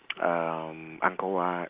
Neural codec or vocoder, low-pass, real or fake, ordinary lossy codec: none; 3.6 kHz; real; Opus, 24 kbps